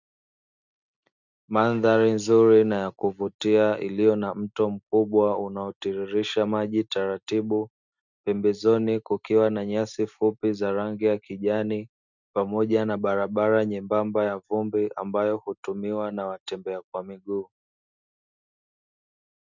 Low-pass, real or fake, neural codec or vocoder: 7.2 kHz; real; none